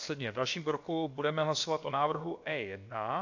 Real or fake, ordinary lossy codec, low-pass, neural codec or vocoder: fake; AAC, 48 kbps; 7.2 kHz; codec, 16 kHz, about 1 kbps, DyCAST, with the encoder's durations